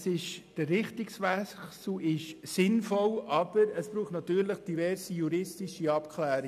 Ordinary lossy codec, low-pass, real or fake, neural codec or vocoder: none; 14.4 kHz; real; none